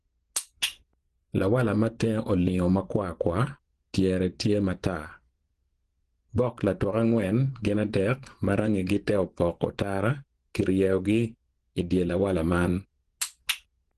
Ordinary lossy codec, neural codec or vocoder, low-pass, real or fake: Opus, 16 kbps; vocoder, 24 kHz, 100 mel bands, Vocos; 10.8 kHz; fake